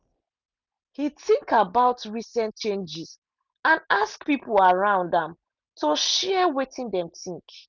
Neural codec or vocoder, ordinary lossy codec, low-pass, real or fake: none; none; 7.2 kHz; real